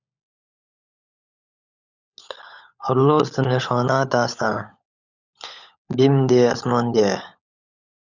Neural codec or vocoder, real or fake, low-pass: codec, 16 kHz, 16 kbps, FunCodec, trained on LibriTTS, 50 frames a second; fake; 7.2 kHz